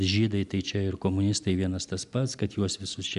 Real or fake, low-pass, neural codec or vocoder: real; 10.8 kHz; none